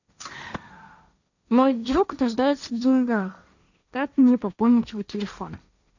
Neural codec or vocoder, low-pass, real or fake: codec, 16 kHz, 1.1 kbps, Voila-Tokenizer; 7.2 kHz; fake